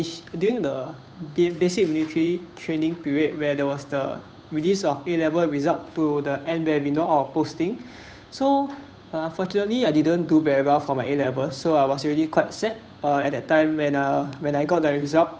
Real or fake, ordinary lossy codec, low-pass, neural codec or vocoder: fake; none; none; codec, 16 kHz, 8 kbps, FunCodec, trained on Chinese and English, 25 frames a second